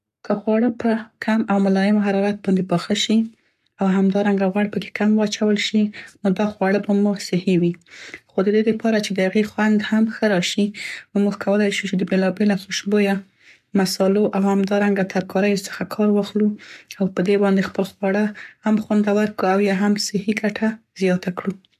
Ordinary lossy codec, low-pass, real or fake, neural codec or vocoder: none; 14.4 kHz; fake; codec, 44.1 kHz, 7.8 kbps, Pupu-Codec